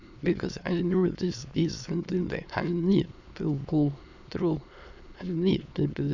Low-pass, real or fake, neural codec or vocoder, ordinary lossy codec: 7.2 kHz; fake; autoencoder, 22.05 kHz, a latent of 192 numbers a frame, VITS, trained on many speakers; none